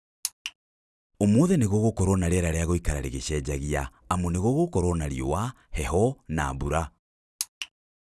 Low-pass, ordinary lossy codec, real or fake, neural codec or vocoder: none; none; real; none